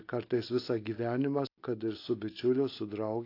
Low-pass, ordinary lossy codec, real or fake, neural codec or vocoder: 5.4 kHz; AAC, 32 kbps; real; none